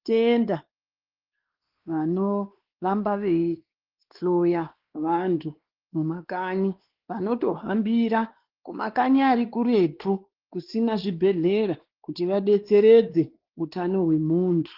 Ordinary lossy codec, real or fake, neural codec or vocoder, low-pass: Opus, 16 kbps; fake; codec, 16 kHz, 2 kbps, X-Codec, WavLM features, trained on Multilingual LibriSpeech; 5.4 kHz